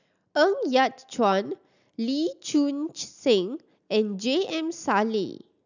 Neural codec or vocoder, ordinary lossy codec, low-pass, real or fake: none; none; 7.2 kHz; real